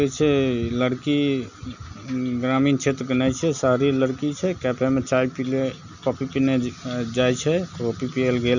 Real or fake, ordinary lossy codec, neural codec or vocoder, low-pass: real; none; none; 7.2 kHz